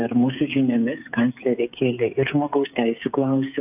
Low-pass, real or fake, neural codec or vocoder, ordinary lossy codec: 3.6 kHz; fake; codec, 16 kHz, 8 kbps, FreqCodec, smaller model; AAC, 32 kbps